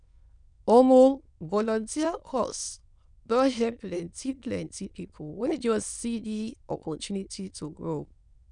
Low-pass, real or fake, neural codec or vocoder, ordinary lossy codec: 9.9 kHz; fake; autoencoder, 22.05 kHz, a latent of 192 numbers a frame, VITS, trained on many speakers; none